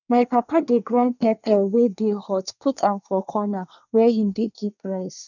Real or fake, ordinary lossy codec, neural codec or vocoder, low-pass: fake; none; codec, 24 kHz, 1 kbps, SNAC; 7.2 kHz